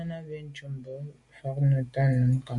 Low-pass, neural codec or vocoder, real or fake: 10.8 kHz; none; real